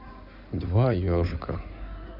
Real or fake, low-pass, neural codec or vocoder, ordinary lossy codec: fake; 5.4 kHz; codec, 16 kHz in and 24 kHz out, 2.2 kbps, FireRedTTS-2 codec; none